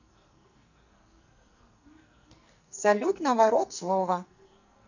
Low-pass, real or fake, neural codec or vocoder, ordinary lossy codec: 7.2 kHz; fake; codec, 44.1 kHz, 2.6 kbps, SNAC; none